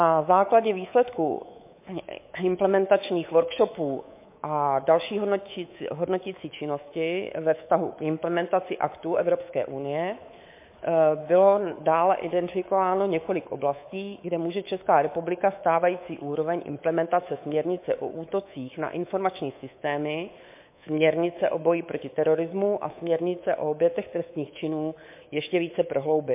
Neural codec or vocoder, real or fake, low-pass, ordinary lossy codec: codec, 24 kHz, 3.1 kbps, DualCodec; fake; 3.6 kHz; MP3, 24 kbps